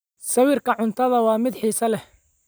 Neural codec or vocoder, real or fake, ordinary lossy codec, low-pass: none; real; none; none